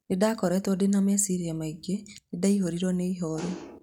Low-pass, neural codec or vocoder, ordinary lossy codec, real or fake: 19.8 kHz; none; none; real